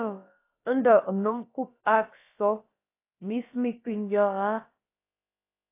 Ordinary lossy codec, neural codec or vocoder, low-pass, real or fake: AAC, 24 kbps; codec, 16 kHz, about 1 kbps, DyCAST, with the encoder's durations; 3.6 kHz; fake